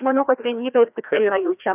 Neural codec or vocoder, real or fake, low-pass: codec, 16 kHz, 1 kbps, FreqCodec, larger model; fake; 3.6 kHz